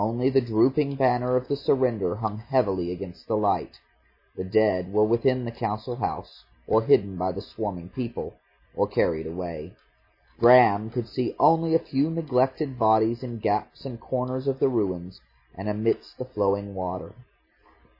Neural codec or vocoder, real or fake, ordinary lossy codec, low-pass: none; real; MP3, 24 kbps; 5.4 kHz